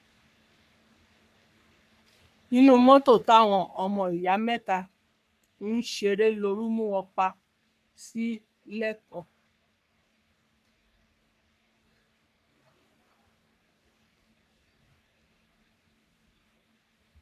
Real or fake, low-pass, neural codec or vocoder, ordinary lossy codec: fake; 14.4 kHz; codec, 44.1 kHz, 3.4 kbps, Pupu-Codec; none